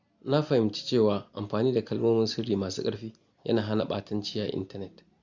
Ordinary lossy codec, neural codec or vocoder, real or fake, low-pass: Opus, 64 kbps; none; real; 7.2 kHz